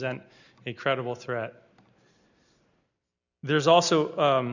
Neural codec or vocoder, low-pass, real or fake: none; 7.2 kHz; real